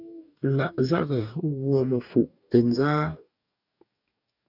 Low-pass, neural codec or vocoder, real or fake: 5.4 kHz; codec, 44.1 kHz, 2.6 kbps, DAC; fake